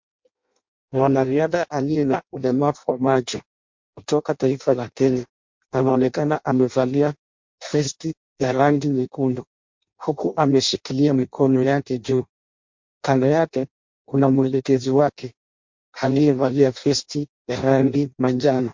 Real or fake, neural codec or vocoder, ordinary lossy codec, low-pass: fake; codec, 16 kHz in and 24 kHz out, 0.6 kbps, FireRedTTS-2 codec; MP3, 48 kbps; 7.2 kHz